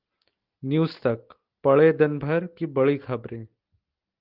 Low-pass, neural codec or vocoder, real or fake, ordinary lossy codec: 5.4 kHz; none; real; Opus, 32 kbps